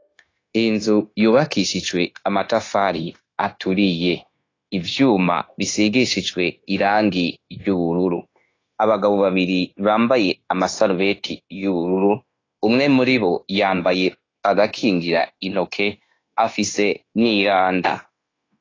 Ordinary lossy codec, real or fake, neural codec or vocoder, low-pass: AAC, 32 kbps; fake; codec, 16 kHz, 0.9 kbps, LongCat-Audio-Codec; 7.2 kHz